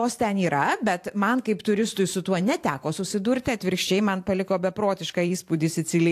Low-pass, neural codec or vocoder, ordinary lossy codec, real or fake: 14.4 kHz; vocoder, 44.1 kHz, 128 mel bands every 512 samples, BigVGAN v2; AAC, 64 kbps; fake